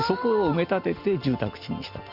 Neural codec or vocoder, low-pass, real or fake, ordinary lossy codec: none; 5.4 kHz; real; Opus, 64 kbps